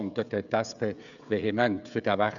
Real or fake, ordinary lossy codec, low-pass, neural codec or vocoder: fake; none; 7.2 kHz; codec, 16 kHz, 16 kbps, FreqCodec, smaller model